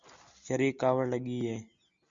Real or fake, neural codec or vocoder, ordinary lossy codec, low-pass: real; none; Opus, 64 kbps; 7.2 kHz